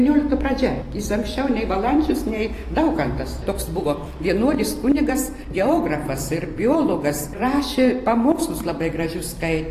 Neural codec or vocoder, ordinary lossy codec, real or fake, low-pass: vocoder, 44.1 kHz, 128 mel bands every 512 samples, BigVGAN v2; AAC, 48 kbps; fake; 14.4 kHz